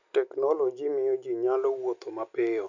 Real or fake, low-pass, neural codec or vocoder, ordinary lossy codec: real; 7.2 kHz; none; none